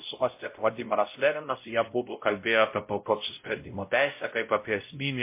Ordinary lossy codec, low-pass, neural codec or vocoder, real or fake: MP3, 24 kbps; 3.6 kHz; codec, 16 kHz, 0.5 kbps, X-Codec, WavLM features, trained on Multilingual LibriSpeech; fake